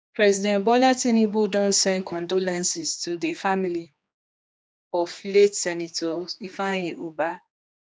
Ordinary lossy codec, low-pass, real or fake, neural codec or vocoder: none; none; fake; codec, 16 kHz, 2 kbps, X-Codec, HuBERT features, trained on general audio